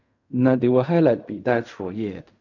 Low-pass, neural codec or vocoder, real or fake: 7.2 kHz; codec, 16 kHz in and 24 kHz out, 0.4 kbps, LongCat-Audio-Codec, fine tuned four codebook decoder; fake